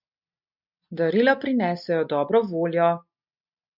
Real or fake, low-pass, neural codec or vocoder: real; 5.4 kHz; none